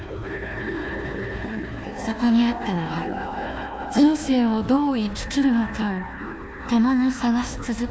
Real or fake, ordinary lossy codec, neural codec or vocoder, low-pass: fake; none; codec, 16 kHz, 1 kbps, FunCodec, trained on Chinese and English, 50 frames a second; none